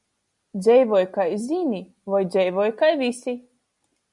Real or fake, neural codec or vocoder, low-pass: real; none; 10.8 kHz